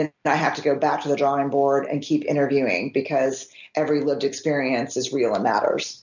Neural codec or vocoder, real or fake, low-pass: none; real; 7.2 kHz